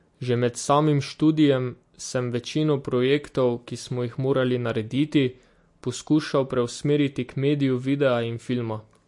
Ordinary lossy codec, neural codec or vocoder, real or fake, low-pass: MP3, 48 kbps; none; real; 10.8 kHz